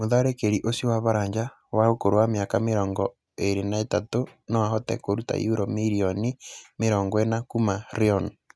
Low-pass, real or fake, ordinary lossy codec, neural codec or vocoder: none; real; none; none